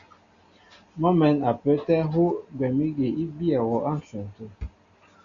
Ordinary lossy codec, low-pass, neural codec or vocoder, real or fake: Opus, 64 kbps; 7.2 kHz; none; real